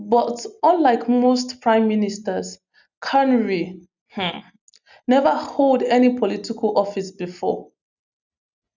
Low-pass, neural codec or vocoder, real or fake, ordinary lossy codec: 7.2 kHz; none; real; none